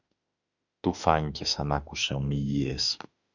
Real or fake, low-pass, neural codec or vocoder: fake; 7.2 kHz; autoencoder, 48 kHz, 32 numbers a frame, DAC-VAE, trained on Japanese speech